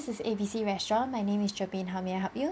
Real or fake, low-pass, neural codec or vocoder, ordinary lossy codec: real; none; none; none